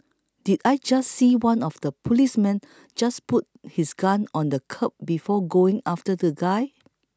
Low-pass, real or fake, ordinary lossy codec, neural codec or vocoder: none; real; none; none